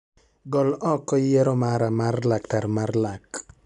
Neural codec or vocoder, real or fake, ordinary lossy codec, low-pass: none; real; none; 10.8 kHz